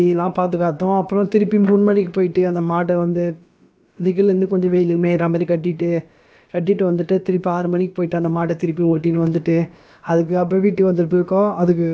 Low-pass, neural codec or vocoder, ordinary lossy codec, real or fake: none; codec, 16 kHz, about 1 kbps, DyCAST, with the encoder's durations; none; fake